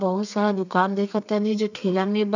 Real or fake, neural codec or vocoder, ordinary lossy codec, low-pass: fake; codec, 32 kHz, 1.9 kbps, SNAC; none; 7.2 kHz